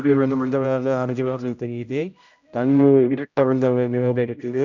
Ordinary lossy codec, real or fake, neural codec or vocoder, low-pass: none; fake; codec, 16 kHz, 0.5 kbps, X-Codec, HuBERT features, trained on general audio; 7.2 kHz